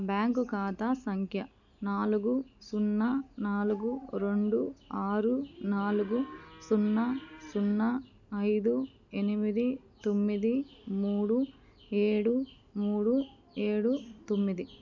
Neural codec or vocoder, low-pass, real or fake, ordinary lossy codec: none; 7.2 kHz; real; none